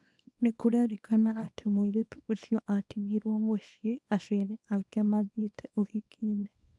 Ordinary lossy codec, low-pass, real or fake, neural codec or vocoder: none; none; fake; codec, 24 kHz, 0.9 kbps, WavTokenizer, small release